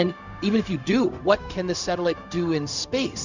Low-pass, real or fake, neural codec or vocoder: 7.2 kHz; fake; codec, 16 kHz, 0.4 kbps, LongCat-Audio-Codec